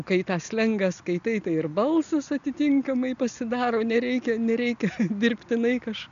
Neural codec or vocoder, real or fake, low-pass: none; real; 7.2 kHz